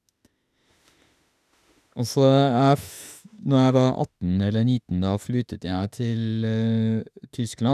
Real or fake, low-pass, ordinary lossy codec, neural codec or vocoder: fake; 14.4 kHz; none; autoencoder, 48 kHz, 32 numbers a frame, DAC-VAE, trained on Japanese speech